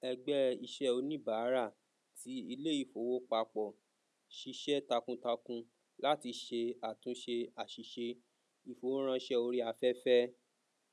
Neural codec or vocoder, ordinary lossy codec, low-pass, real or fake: none; none; none; real